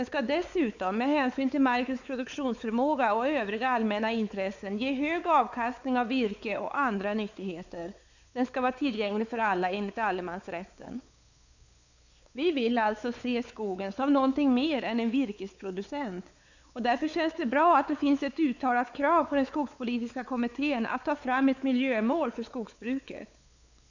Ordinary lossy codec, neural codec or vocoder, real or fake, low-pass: none; codec, 16 kHz, 4 kbps, X-Codec, WavLM features, trained on Multilingual LibriSpeech; fake; 7.2 kHz